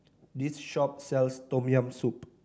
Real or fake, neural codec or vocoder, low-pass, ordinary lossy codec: real; none; none; none